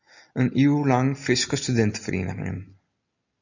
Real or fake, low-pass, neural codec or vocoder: real; 7.2 kHz; none